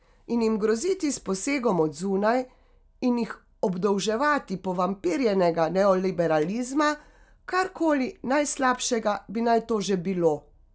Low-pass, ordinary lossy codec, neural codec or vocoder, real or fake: none; none; none; real